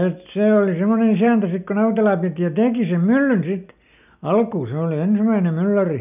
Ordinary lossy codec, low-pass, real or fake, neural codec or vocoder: none; 3.6 kHz; real; none